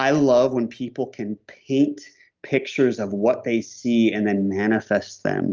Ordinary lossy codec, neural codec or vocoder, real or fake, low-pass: Opus, 24 kbps; none; real; 7.2 kHz